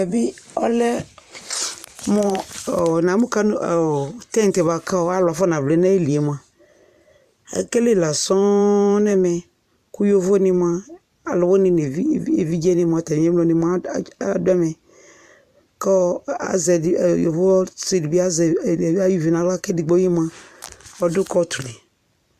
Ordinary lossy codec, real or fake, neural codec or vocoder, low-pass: AAC, 96 kbps; fake; vocoder, 44.1 kHz, 128 mel bands every 512 samples, BigVGAN v2; 14.4 kHz